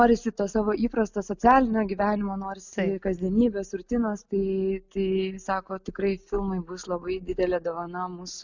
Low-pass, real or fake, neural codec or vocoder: 7.2 kHz; real; none